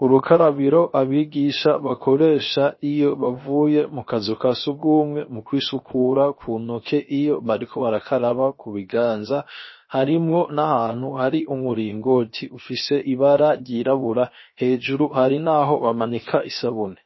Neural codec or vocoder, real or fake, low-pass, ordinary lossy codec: codec, 16 kHz, 0.7 kbps, FocalCodec; fake; 7.2 kHz; MP3, 24 kbps